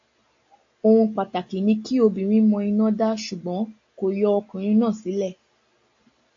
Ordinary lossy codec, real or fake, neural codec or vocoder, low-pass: AAC, 48 kbps; real; none; 7.2 kHz